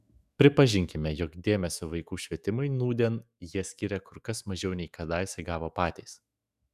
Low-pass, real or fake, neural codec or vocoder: 14.4 kHz; fake; autoencoder, 48 kHz, 128 numbers a frame, DAC-VAE, trained on Japanese speech